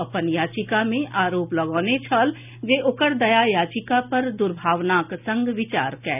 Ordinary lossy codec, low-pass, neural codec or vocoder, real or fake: none; 3.6 kHz; none; real